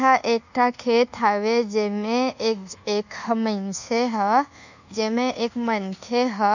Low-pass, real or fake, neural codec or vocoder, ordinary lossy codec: 7.2 kHz; fake; codec, 24 kHz, 1.2 kbps, DualCodec; none